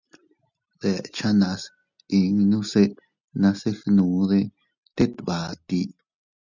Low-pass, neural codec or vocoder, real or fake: 7.2 kHz; none; real